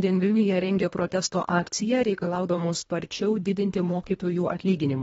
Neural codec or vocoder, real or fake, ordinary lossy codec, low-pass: codec, 24 kHz, 1.5 kbps, HILCodec; fake; AAC, 24 kbps; 10.8 kHz